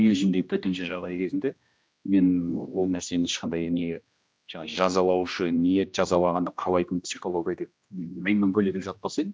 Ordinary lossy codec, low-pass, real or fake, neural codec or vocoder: none; none; fake; codec, 16 kHz, 1 kbps, X-Codec, HuBERT features, trained on general audio